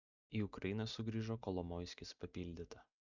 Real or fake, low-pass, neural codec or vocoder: real; 7.2 kHz; none